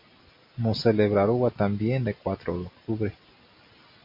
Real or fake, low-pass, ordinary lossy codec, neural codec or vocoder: real; 5.4 kHz; MP3, 32 kbps; none